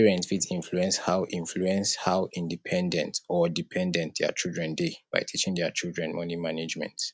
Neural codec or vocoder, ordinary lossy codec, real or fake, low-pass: none; none; real; none